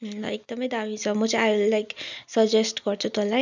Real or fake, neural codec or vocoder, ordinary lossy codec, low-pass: real; none; none; 7.2 kHz